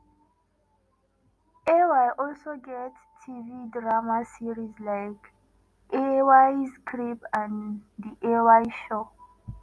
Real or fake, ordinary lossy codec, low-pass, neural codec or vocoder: real; none; none; none